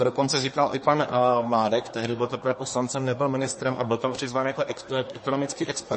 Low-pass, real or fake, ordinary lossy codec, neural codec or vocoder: 10.8 kHz; fake; MP3, 32 kbps; codec, 24 kHz, 1 kbps, SNAC